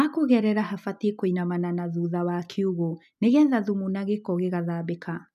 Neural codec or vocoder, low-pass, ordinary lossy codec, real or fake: none; 14.4 kHz; none; real